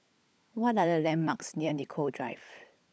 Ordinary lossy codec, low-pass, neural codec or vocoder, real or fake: none; none; codec, 16 kHz, 16 kbps, FunCodec, trained on LibriTTS, 50 frames a second; fake